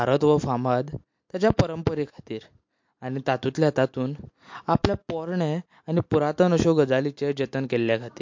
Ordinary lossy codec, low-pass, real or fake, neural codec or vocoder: MP3, 48 kbps; 7.2 kHz; real; none